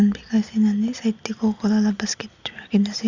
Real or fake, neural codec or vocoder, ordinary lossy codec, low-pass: real; none; Opus, 64 kbps; 7.2 kHz